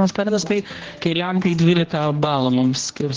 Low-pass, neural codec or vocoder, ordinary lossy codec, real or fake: 7.2 kHz; codec, 16 kHz, 1 kbps, X-Codec, HuBERT features, trained on general audio; Opus, 16 kbps; fake